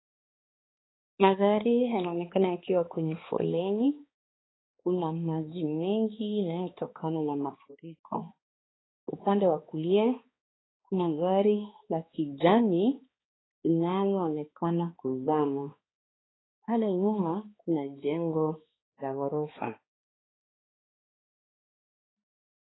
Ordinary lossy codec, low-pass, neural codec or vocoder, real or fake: AAC, 16 kbps; 7.2 kHz; codec, 16 kHz, 2 kbps, X-Codec, HuBERT features, trained on balanced general audio; fake